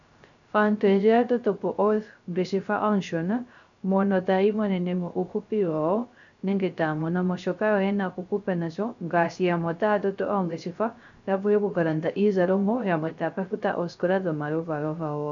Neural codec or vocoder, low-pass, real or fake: codec, 16 kHz, 0.3 kbps, FocalCodec; 7.2 kHz; fake